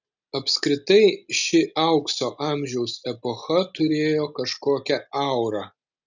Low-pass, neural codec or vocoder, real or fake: 7.2 kHz; none; real